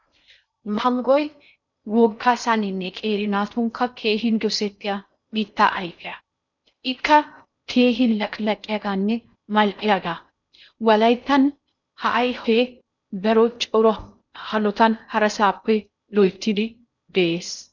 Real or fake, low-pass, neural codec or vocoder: fake; 7.2 kHz; codec, 16 kHz in and 24 kHz out, 0.6 kbps, FocalCodec, streaming, 4096 codes